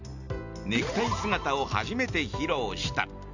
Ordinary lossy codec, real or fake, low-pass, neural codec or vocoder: none; real; 7.2 kHz; none